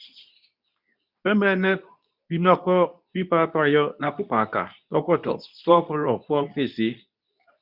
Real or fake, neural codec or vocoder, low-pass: fake; codec, 24 kHz, 0.9 kbps, WavTokenizer, medium speech release version 2; 5.4 kHz